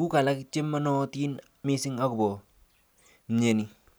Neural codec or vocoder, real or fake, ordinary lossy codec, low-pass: none; real; none; none